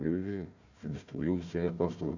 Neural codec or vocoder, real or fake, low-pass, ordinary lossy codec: codec, 16 kHz, 1 kbps, FunCodec, trained on Chinese and English, 50 frames a second; fake; 7.2 kHz; none